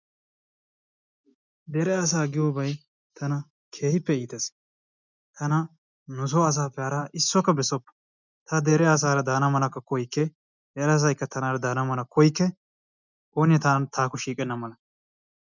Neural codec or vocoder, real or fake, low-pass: none; real; 7.2 kHz